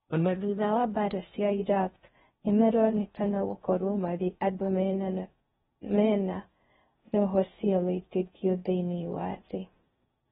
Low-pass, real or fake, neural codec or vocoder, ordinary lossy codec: 10.8 kHz; fake; codec, 16 kHz in and 24 kHz out, 0.6 kbps, FocalCodec, streaming, 4096 codes; AAC, 16 kbps